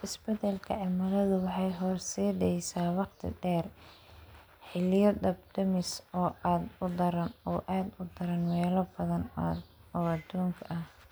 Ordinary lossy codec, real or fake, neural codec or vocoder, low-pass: none; real; none; none